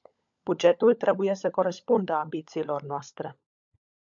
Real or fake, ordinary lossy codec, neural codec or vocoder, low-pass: fake; MP3, 64 kbps; codec, 16 kHz, 16 kbps, FunCodec, trained on LibriTTS, 50 frames a second; 7.2 kHz